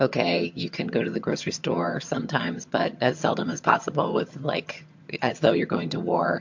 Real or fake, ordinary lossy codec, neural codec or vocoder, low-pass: fake; MP3, 48 kbps; vocoder, 22.05 kHz, 80 mel bands, HiFi-GAN; 7.2 kHz